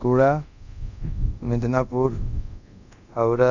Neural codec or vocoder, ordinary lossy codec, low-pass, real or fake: codec, 24 kHz, 0.5 kbps, DualCodec; none; 7.2 kHz; fake